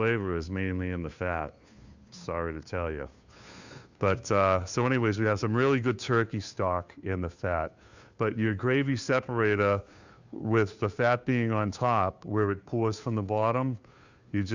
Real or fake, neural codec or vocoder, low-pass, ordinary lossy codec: fake; codec, 16 kHz, 2 kbps, FunCodec, trained on Chinese and English, 25 frames a second; 7.2 kHz; Opus, 64 kbps